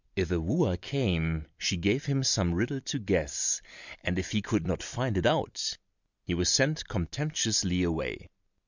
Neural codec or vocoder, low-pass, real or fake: none; 7.2 kHz; real